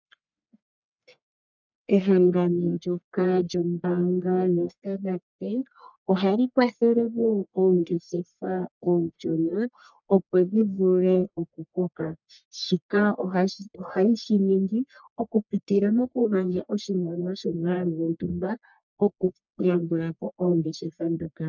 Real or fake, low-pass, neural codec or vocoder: fake; 7.2 kHz; codec, 44.1 kHz, 1.7 kbps, Pupu-Codec